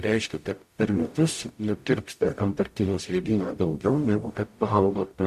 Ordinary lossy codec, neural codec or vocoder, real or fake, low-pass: MP3, 64 kbps; codec, 44.1 kHz, 0.9 kbps, DAC; fake; 14.4 kHz